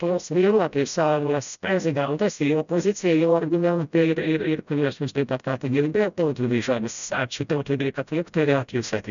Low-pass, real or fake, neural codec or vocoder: 7.2 kHz; fake; codec, 16 kHz, 0.5 kbps, FreqCodec, smaller model